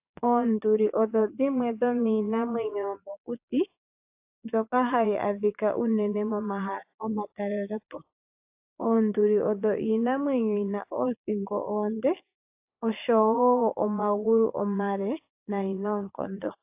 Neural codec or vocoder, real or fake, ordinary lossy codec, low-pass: vocoder, 22.05 kHz, 80 mel bands, Vocos; fake; AAC, 32 kbps; 3.6 kHz